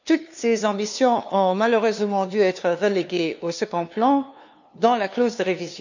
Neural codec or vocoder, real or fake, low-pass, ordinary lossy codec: codec, 16 kHz, 2 kbps, FunCodec, trained on LibriTTS, 25 frames a second; fake; 7.2 kHz; none